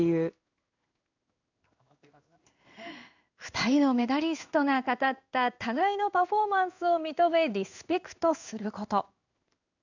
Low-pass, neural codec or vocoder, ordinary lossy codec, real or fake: 7.2 kHz; codec, 16 kHz in and 24 kHz out, 1 kbps, XY-Tokenizer; none; fake